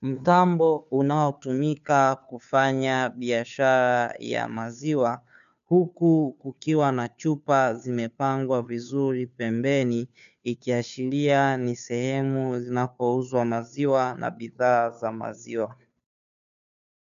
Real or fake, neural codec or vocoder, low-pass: fake; codec, 16 kHz, 2 kbps, FunCodec, trained on Chinese and English, 25 frames a second; 7.2 kHz